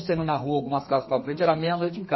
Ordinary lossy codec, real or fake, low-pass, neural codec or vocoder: MP3, 24 kbps; fake; 7.2 kHz; codec, 32 kHz, 1.9 kbps, SNAC